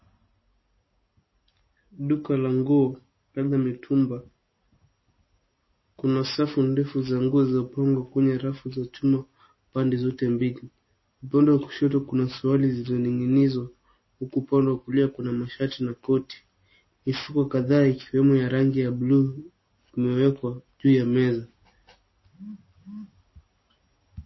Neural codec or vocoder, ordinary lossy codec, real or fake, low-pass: none; MP3, 24 kbps; real; 7.2 kHz